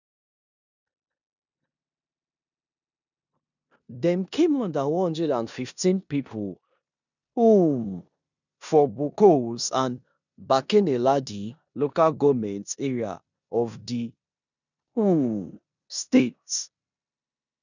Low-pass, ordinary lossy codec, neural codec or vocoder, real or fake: 7.2 kHz; none; codec, 16 kHz in and 24 kHz out, 0.9 kbps, LongCat-Audio-Codec, four codebook decoder; fake